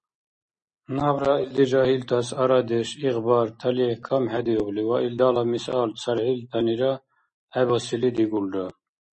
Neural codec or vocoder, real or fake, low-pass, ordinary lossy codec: none; real; 10.8 kHz; MP3, 32 kbps